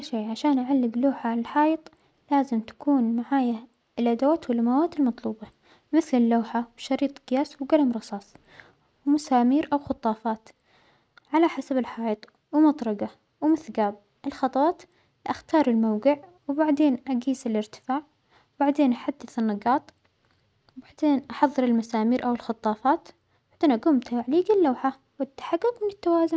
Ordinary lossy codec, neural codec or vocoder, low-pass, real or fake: none; none; none; real